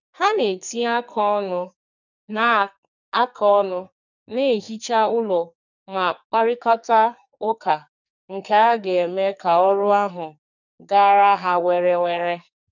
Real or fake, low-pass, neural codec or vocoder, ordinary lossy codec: fake; 7.2 kHz; codec, 44.1 kHz, 2.6 kbps, SNAC; none